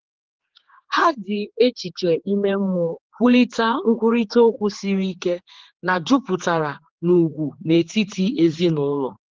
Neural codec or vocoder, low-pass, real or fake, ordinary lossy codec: codec, 16 kHz, 4 kbps, X-Codec, HuBERT features, trained on general audio; 7.2 kHz; fake; Opus, 16 kbps